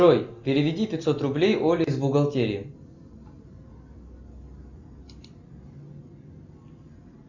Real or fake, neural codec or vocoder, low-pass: real; none; 7.2 kHz